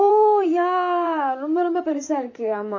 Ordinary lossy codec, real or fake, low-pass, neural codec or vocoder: none; fake; 7.2 kHz; vocoder, 44.1 kHz, 128 mel bands, Pupu-Vocoder